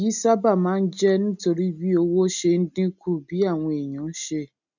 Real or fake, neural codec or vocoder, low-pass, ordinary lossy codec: real; none; 7.2 kHz; none